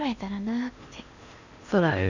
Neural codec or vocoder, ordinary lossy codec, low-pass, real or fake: codec, 16 kHz in and 24 kHz out, 0.8 kbps, FocalCodec, streaming, 65536 codes; none; 7.2 kHz; fake